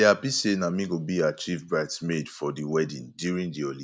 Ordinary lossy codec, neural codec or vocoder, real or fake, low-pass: none; none; real; none